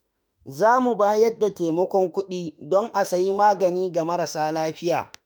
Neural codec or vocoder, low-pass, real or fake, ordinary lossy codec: autoencoder, 48 kHz, 32 numbers a frame, DAC-VAE, trained on Japanese speech; none; fake; none